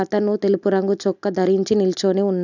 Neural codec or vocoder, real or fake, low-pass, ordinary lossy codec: none; real; 7.2 kHz; none